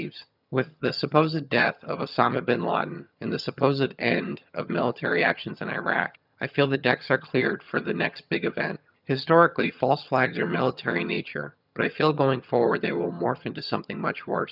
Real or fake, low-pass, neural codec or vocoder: fake; 5.4 kHz; vocoder, 22.05 kHz, 80 mel bands, HiFi-GAN